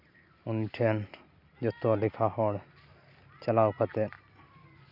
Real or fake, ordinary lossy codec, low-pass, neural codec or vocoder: real; none; 5.4 kHz; none